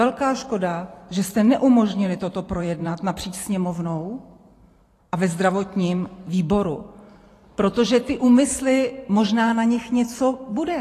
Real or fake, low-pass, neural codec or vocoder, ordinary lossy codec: fake; 14.4 kHz; vocoder, 44.1 kHz, 128 mel bands every 512 samples, BigVGAN v2; AAC, 48 kbps